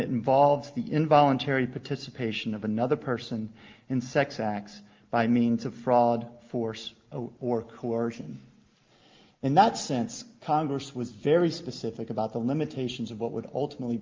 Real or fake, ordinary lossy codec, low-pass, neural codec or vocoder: real; Opus, 32 kbps; 7.2 kHz; none